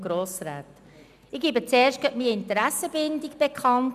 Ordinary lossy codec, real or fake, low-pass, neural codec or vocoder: none; real; 14.4 kHz; none